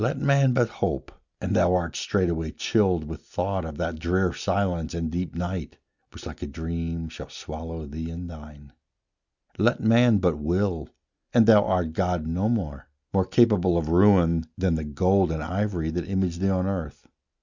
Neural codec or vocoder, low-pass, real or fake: none; 7.2 kHz; real